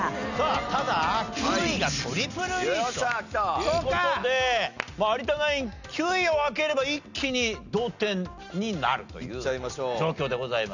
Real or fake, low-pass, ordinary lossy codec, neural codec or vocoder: real; 7.2 kHz; none; none